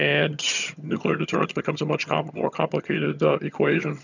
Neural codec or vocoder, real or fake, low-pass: vocoder, 22.05 kHz, 80 mel bands, HiFi-GAN; fake; 7.2 kHz